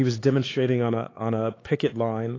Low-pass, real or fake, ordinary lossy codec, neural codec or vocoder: 7.2 kHz; fake; AAC, 32 kbps; codec, 16 kHz, 4 kbps, X-Codec, HuBERT features, trained on LibriSpeech